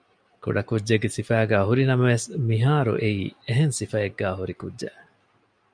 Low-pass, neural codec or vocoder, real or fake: 9.9 kHz; none; real